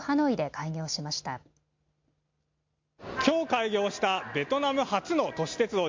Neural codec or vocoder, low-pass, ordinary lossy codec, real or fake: none; 7.2 kHz; MP3, 48 kbps; real